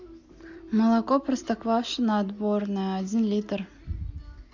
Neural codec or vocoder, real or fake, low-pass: none; real; 7.2 kHz